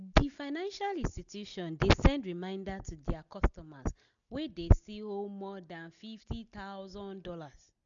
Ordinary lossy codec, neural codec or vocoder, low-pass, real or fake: none; none; 7.2 kHz; real